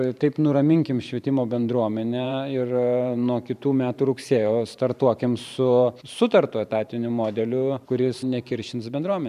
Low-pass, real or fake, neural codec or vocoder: 14.4 kHz; fake; vocoder, 44.1 kHz, 128 mel bands every 512 samples, BigVGAN v2